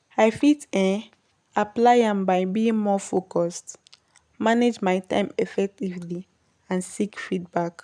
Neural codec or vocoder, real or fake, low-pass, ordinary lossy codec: none; real; 9.9 kHz; none